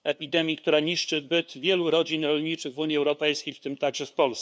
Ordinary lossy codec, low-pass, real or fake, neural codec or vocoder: none; none; fake; codec, 16 kHz, 2 kbps, FunCodec, trained on LibriTTS, 25 frames a second